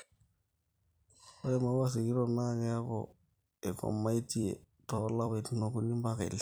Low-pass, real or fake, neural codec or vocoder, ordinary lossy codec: none; fake; vocoder, 44.1 kHz, 128 mel bands every 256 samples, BigVGAN v2; none